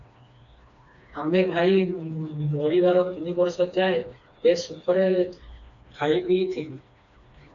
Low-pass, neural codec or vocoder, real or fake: 7.2 kHz; codec, 16 kHz, 2 kbps, FreqCodec, smaller model; fake